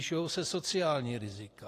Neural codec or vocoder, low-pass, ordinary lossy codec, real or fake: vocoder, 44.1 kHz, 128 mel bands every 512 samples, BigVGAN v2; 14.4 kHz; AAC, 48 kbps; fake